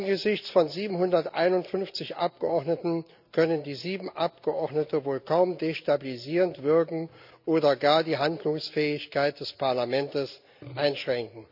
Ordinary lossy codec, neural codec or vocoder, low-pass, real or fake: none; none; 5.4 kHz; real